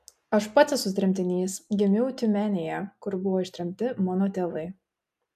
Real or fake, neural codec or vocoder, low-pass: real; none; 14.4 kHz